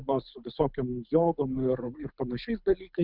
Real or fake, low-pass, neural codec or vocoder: fake; 5.4 kHz; codec, 24 kHz, 6 kbps, HILCodec